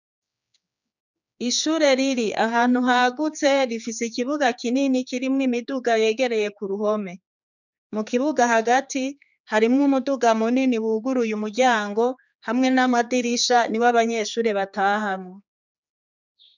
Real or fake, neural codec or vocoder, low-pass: fake; codec, 16 kHz, 4 kbps, X-Codec, HuBERT features, trained on general audio; 7.2 kHz